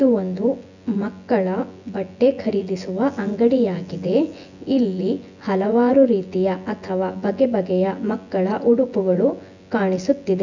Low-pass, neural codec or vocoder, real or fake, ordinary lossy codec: 7.2 kHz; vocoder, 24 kHz, 100 mel bands, Vocos; fake; none